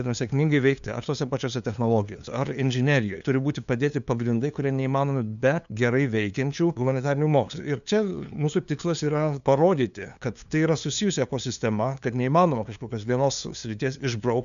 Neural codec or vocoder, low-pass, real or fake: codec, 16 kHz, 2 kbps, FunCodec, trained on LibriTTS, 25 frames a second; 7.2 kHz; fake